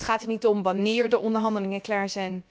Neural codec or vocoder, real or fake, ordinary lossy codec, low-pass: codec, 16 kHz, about 1 kbps, DyCAST, with the encoder's durations; fake; none; none